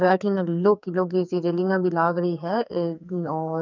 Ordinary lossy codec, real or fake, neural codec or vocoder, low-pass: none; fake; codec, 44.1 kHz, 2.6 kbps, SNAC; 7.2 kHz